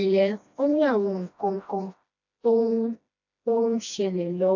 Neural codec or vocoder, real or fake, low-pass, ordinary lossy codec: codec, 16 kHz, 1 kbps, FreqCodec, smaller model; fake; 7.2 kHz; AAC, 48 kbps